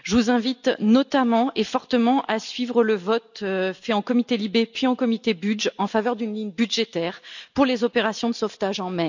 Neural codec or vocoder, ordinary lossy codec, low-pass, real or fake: none; none; 7.2 kHz; real